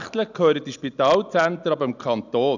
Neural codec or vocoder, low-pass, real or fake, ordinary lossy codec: none; 7.2 kHz; real; none